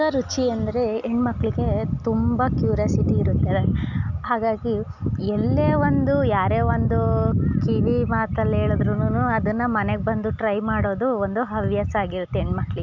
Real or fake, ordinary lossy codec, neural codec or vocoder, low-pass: real; none; none; 7.2 kHz